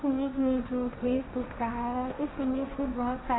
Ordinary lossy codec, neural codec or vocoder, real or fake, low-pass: AAC, 16 kbps; codec, 16 kHz, 1.1 kbps, Voila-Tokenizer; fake; 7.2 kHz